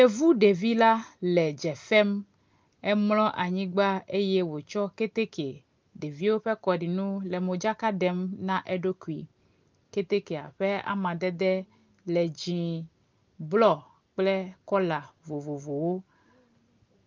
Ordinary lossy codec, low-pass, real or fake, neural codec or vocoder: Opus, 32 kbps; 7.2 kHz; real; none